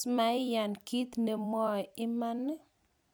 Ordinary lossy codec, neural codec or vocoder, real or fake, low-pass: none; vocoder, 44.1 kHz, 128 mel bands every 256 samples, BigVGAN v2; fake; none